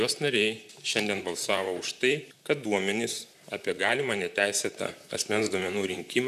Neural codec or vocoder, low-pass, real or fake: vocoder, 44.1 kHz, 128 mel bands, Pupu-Vocoder; 14.4 kHz; fake